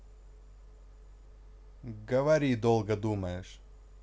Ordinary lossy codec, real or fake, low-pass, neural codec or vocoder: none; real; none; none